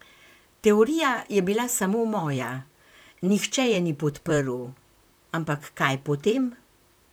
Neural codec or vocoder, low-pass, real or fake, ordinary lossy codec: vocoder, 44.1 kHz, 128 mel bands, Pupu-Vocoder; none; fake; none